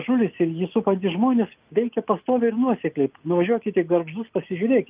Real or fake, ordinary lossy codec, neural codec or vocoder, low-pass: real; Opus, 24 kbps; none; 3.6 kHz